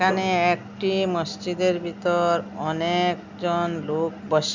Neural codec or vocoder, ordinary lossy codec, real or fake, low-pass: none; none; real; 7.2 kHz